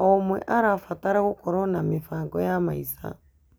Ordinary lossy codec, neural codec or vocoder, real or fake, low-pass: none; none; real; none